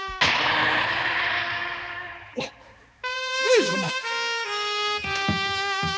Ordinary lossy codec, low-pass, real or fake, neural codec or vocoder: none; none; fake; codec, 16 kHz, 4 kbps, X-Codec, HuBERT features, trained on balanced general audio